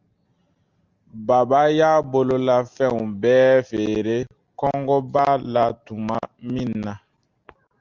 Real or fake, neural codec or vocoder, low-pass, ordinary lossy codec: real; none; 7.2 kHz; Opus, 32 kbps